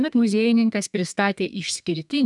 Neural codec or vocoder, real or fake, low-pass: codec, 32 kHz, 1.9 kbps, SNAC; fake; 10.8 kHz